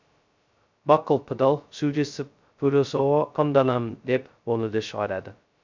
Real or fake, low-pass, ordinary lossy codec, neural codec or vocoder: fake; 7.2 kHz; MP3, 64 kbps; codec, 16 kHz, 0.2 kbps, FocalCodec